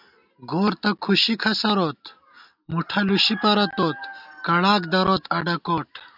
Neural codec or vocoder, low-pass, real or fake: none; 5.4 kHz; real